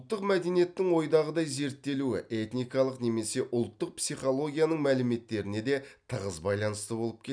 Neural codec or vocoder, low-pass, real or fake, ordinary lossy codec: none; 9.9 kHz; real; none